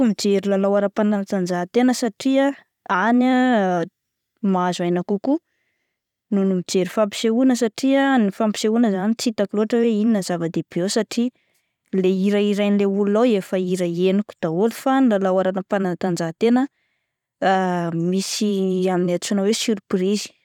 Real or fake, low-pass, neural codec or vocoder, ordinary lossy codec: fake; 19.8 kHz; vocoder, 44.1 kHz, 128 mel bands every 512 samples, BigVGAN v2; none